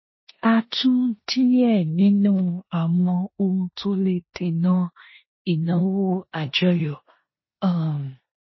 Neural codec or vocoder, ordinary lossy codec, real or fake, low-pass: codec, 16 kHz in and 24 kHz out, 0.9 kbps, LongCat-Audio-Codec, four codebook decoder; MP3, 24 kbps; fake; 7.2 kHz